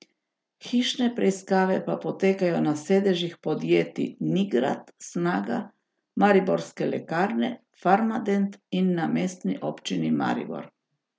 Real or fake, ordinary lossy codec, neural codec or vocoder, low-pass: real; none; none; none